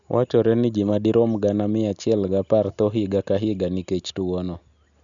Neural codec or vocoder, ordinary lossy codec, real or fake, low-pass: none; none; real; 7.2 kHz